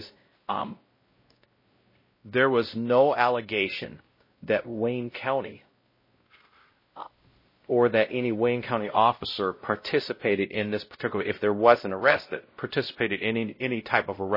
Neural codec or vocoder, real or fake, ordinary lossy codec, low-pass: codec, 16 kHz, 0.5 kbps, X-Codec, WavLM features, trained on Multilingual LibriSpeech; fake; MP3, 24 kbps; 5.4 kHz